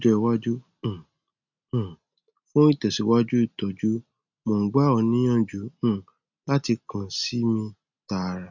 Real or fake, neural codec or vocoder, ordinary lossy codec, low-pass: real; none; none; 7.2 kHz